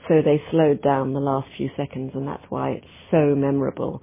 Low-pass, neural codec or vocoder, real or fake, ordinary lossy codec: 3.6 kHz; none; real; MP3, 16 kbps